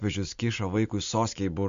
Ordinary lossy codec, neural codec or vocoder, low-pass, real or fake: MP3, 48 kbps; none; 7.2 kHz; real